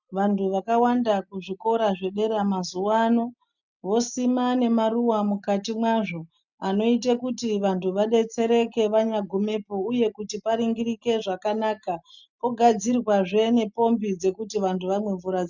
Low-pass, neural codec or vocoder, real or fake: 7.2 kHz; none; real